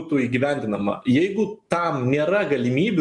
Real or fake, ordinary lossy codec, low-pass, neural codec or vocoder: real; MP3, 64 kbps; 10.8 kHz; none